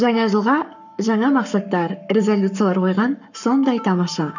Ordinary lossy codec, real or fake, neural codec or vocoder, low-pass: none; fake; codec, 44.1 kHz, 7.8 kbps, Pupu-Codec; 7.2 kHz